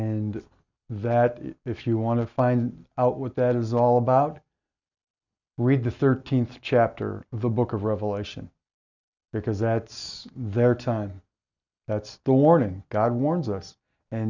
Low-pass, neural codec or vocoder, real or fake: 7.2 kHz; none; real